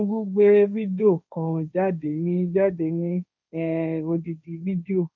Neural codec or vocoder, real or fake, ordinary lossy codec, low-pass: codec, 16 kHz, 1.1 kbps, Voila-Tokenizer; fake; none; 7.2 kHz